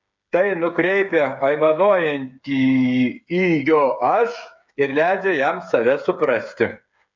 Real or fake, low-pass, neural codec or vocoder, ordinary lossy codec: fake; 7.2 kHz; codec, 16 kHz, 8 kbps, FreqCodec, smaller model; MP3, 64 kbps